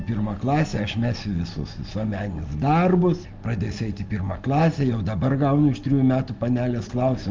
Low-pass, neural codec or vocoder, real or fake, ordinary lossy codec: 7.2 kHz; none; real; Opus, 32 kbps